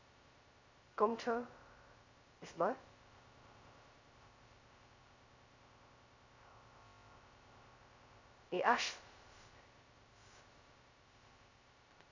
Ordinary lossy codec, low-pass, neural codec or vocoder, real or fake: Opus, 64 kbps; 7.2 kHz; codec, 16 kHz, 0.2 kbps, FocalCodec; fake